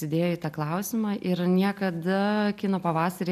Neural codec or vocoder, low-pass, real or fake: none; 14.4 kHz; real